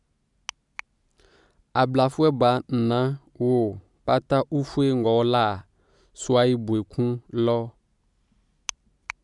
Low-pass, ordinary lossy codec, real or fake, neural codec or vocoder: 10.8 kHz; MP3, 96 kbps; real; none